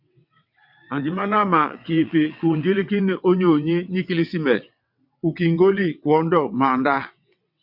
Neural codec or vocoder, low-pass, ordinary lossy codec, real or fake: vocoder, 22.05 kHz, 80 mel bands, WaveNeXt; 5.4 kHz; MP3, 48 kbps; fake